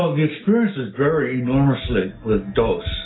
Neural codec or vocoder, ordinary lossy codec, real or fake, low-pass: none; AAC, 16 kbps; real; 7.2 kHz